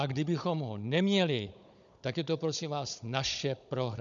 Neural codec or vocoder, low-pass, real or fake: codec, 16 kHz, 16 kbps, FunCodec, trained on Chinese and English, 50 frames a second; 7.2 kHz; fake